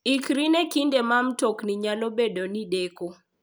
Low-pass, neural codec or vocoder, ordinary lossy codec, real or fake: none; none; none; real